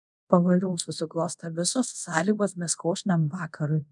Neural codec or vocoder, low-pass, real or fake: codec, 24 kHz, 0.5 kbps, DualCodec; 10.8 kHz; fake